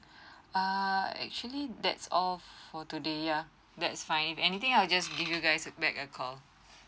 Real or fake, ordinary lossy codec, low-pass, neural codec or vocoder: real; none; none; none